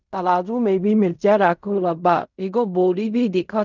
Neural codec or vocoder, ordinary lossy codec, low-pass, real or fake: codec, 16 kHz in and 24 kHz out, 0.4 kbps, LongCat-Audio-Codec, fine tuned four codebook decoder; none; 7.2 kHz; fake